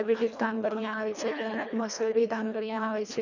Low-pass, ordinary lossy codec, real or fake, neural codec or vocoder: 7.2 kHz; none; fake; codec, 24 kHz, 1.5 kbps, HILCodec